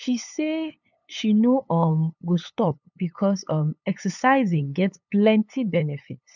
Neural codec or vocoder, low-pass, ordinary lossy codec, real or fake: codec, 16 kHz, 8 kbps, FunCodec, trained on LibriTTS, 25 frames a second; 7.2 kHz; none; fake